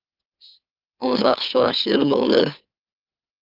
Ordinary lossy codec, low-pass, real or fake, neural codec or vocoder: Opus, 24 kbps; 5.4 kHz; fake; autoencoder, 44.1 kHz, a latent of 192 numbers a frame, MeloTTS